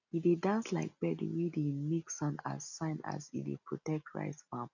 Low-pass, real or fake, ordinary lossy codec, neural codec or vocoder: 7.2 kHz; real; none; none